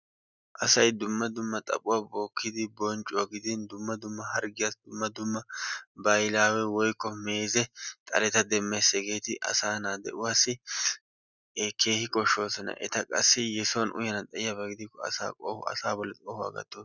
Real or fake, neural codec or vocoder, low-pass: real; none; 7.2 kHz